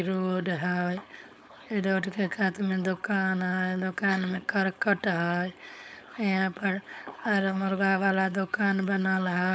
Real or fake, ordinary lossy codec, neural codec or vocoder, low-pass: fake; none; codec, 16 kHz, 4.8 kbps, FACodec; none